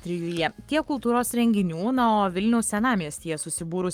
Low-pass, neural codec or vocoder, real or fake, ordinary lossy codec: 19.8 kHz; codec, 44.1 kHz, 7.8 kbps, Pupu-Codec; fake; Opus, 32 kbps